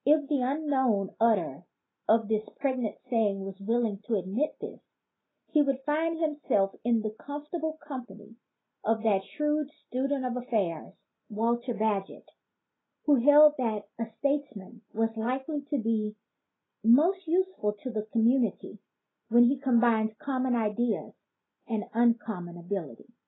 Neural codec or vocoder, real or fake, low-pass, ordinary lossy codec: none; real; 7.2 kHz; AAC, 16 kbps